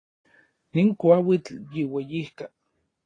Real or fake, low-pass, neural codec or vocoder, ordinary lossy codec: real; 9.9 kHz; none; AAC, 32 kbps